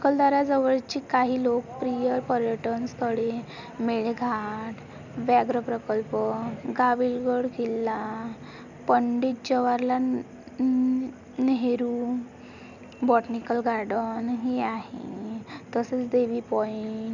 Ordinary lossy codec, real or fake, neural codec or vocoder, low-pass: none; real; none; 7.2 kHz